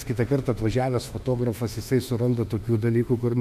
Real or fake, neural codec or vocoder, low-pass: fake; autoencoder, 48 kHz, 32 numbers a frame, DAC-VAE, trained on Japanese speech; 14.4 kHz